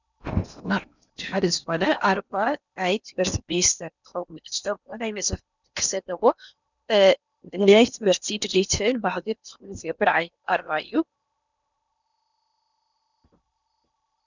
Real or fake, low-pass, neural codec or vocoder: fake; 7.2 kHz; codec, 16 kHz in and 24 kHz out, 0.8 kbps, FocalCodec, streaming, 65536 codes